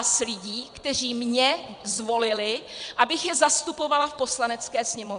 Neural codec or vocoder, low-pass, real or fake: vocoder, 22.05 kHz, 80 mel bands, WaveNeXt; 9.9 kHz; fake